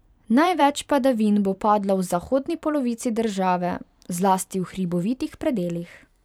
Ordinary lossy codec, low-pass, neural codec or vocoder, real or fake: none; 19.8 kHz; vocoder, 44.1 kHz, 128 mel bands every 512 samples, BigVGAN v2; fake